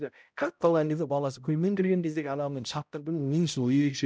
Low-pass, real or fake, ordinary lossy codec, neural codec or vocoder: none; fake; none; codec, 16 kHz, 0.5 kbps, X-Codec, HuBERT features, trained on balanced general audio